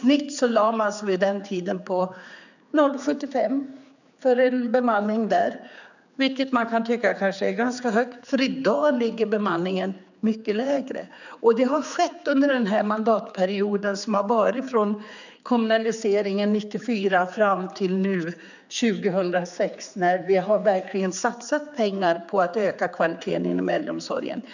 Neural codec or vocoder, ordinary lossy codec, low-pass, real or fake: codec, 16 kHz, 4 kbps, X-Codec, HuBERT features, trained on general audio; none; 7.2 kHz; fake